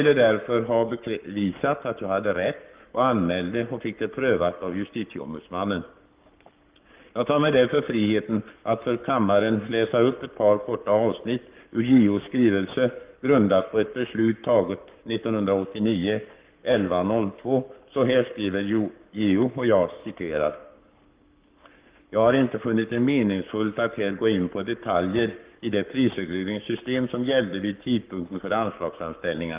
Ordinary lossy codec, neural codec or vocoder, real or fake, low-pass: Opus, 32 kbps; codec, 44.1 kHz, 7.8 kbps, Pupu-Codec; fake; 3.6 kHz